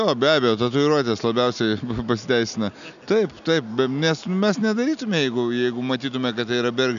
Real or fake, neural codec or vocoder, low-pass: real; none; 7.2 kHz